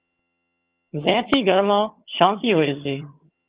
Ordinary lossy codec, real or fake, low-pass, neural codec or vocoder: Opus, 24 kbps; fake; 3.6 kHz; vocoder, 22.05 kHz, 80 mel bands, HiFi-GAN